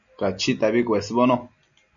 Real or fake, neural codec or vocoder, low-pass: real; none; 7.2 kHz